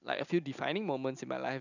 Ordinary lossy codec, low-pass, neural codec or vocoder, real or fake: none; 7.2 kHz; none; real